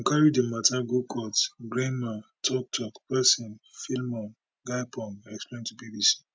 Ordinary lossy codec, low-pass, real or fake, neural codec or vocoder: none; none; real; none